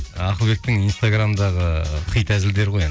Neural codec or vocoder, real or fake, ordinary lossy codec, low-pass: none; real; none; none